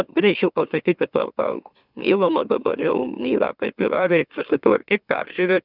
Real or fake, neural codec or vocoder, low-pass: fake; autoencoder, 44.1 kHz, a latent of 192 numbers a frame, MeloTTS; 5.4 kHz